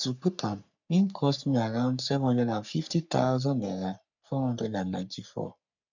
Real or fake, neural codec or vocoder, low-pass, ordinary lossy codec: fake; codec, 44.1 kHz, 3.4 kbps, Pupu-Codec; 7.2 kHz; none